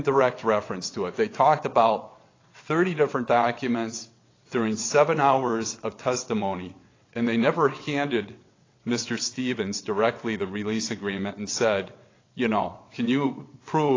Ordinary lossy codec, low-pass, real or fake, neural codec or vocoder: AAC, 32 kbps; 7.2 kHz; fake; vocoder, 22.05 kHz, 80 mel bands, WaveNeXt